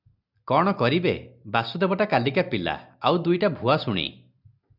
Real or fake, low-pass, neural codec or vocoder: real; 5.4 kHz; none